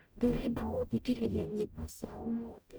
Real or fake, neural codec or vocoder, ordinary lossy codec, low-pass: fake; codec, 44.1 kHz, 0.9 kbps, DAC; none; none